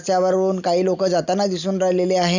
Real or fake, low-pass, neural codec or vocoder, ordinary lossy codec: real; 7.2 kHz; none; none